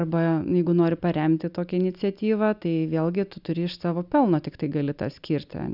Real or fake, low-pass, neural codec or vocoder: real; 5.4 kHz; none